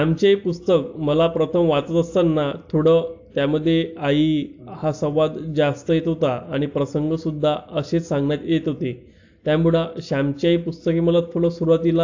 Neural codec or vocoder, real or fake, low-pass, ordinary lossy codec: none; real; 7.2 kHz; AAC, 48 kbps